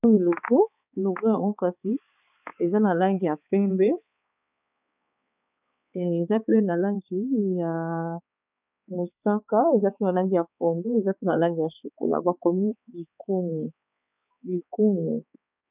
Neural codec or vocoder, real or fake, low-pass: codec, 16 kHz, 4 kbps, X-Codec, HuBERT features, trained on balanced general audio; fake; 3.6 kHz